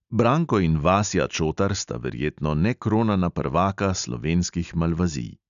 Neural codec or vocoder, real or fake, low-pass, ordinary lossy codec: none; real; 7.2 kHz; none